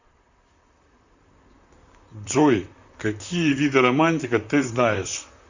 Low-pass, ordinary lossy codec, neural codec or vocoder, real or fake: 7.2 kHz; Opus, 64 kbps; vocoder, 44.1 kHz, 128 mel bands, Pupu-Vocoder; fake